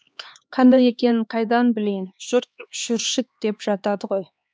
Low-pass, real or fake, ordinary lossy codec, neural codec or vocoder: none; fake; none; codec, 16 kHz, 2 kbps, X-Codec, HuBERT features, trained on LibriSpeech